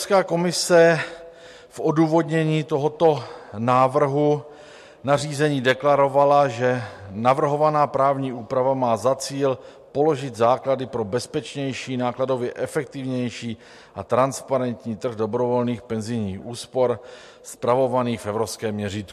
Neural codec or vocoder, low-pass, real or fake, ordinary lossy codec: none; 14.4 kHz; real; MP3, 64 kbps